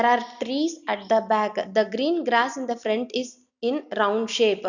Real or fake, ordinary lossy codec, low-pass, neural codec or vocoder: real; none; 7.2 kHz; none